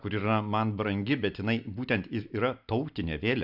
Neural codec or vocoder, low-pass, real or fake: none; 5.4 kHz; real